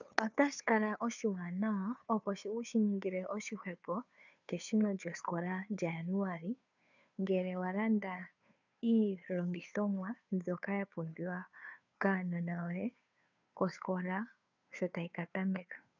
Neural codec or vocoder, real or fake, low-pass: codec, 16 kHz, 2 kbps, FunCodec, trained on Chinese and English, 25 frames a second; fake; 7.2 kHz